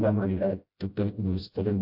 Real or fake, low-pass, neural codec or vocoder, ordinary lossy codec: fake; 5.4 kHz; codec, 16 kHz, 0.5 kbps, FreqCodec, smaller model; AAC, 48 kbps